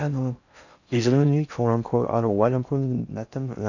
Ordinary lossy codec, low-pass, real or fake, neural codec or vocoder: none; 7.2 kHz; fake; codec, 16 kHz in and 24 kHz out, 0.6 kbps, FocalCodec, streaming, 4096 codes